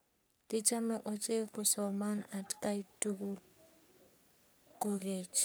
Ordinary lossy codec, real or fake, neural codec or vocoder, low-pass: none; fake; codec, 44.1 kHz, 3.4 kbps, Pupu-Codec; none